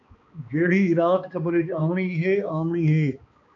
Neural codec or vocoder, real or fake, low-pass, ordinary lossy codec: codec, 16 kHz, 2 kbps, X-Codec, HuBERT features, trained on balanced general audio; fake; 7.2 kHz; AAC, 48 kbps